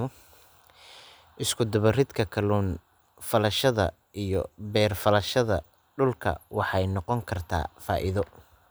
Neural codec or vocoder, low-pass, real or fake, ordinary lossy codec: none; none; real; none